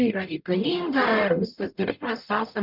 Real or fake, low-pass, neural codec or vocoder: fake; 5.4 kHz; codec, 44.1 kHz, 0.9 kbps, DAC